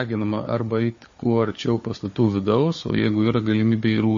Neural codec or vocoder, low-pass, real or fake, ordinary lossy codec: codec, 16 kHz, 4 kbps, FunCodec, trained on Chinese and English, 50 frames a second; 7.2 kHz; fake; MP3, 32 kbps